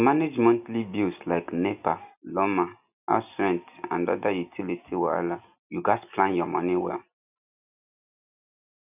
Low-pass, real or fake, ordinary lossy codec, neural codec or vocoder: 3.6 kHz; real; none; none